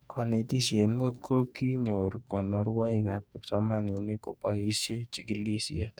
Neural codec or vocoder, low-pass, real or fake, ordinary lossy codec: codec, 44.1 kHz, 2.6 kbps, DAC; none; fake; none